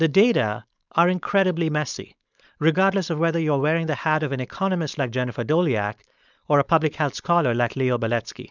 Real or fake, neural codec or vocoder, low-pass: fake; codec, 16 kHz, 4.8 kbps, FACodec; 7.2 kHz